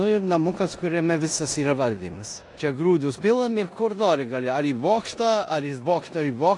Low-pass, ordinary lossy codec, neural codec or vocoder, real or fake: 10.8 kHz; AAC, 48 kbps; codec, 16 kHz in and 24 kHz out, 0.9 kbps, LongCat-Audio-Codec, four codebook decoder; fake